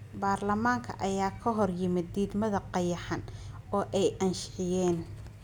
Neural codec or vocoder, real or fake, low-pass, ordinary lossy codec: none; real; 19.8 kHz; none